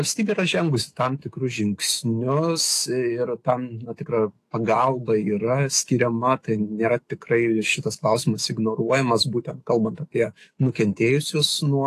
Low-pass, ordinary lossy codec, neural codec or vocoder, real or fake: 14.4 kHz; AAC, 48 kbps; autoencoder, 48 kHz, 128 numbers a frame, DAC-VAE, trained on Japanese speech; fake